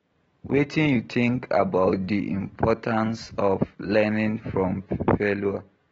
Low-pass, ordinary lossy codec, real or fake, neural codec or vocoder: 9.9 kHz; AAC, 24 kbps; real; none